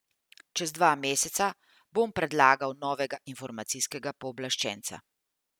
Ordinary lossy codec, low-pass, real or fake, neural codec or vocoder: none; none; real; none